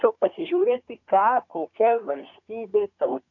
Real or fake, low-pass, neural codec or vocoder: fake; 7.2 kHz; codec, 24 kHz, 1 kbps, SNAC